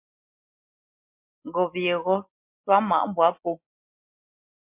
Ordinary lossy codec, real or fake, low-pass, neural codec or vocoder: MP3, 32 kbps; real; 3.6 kHz; none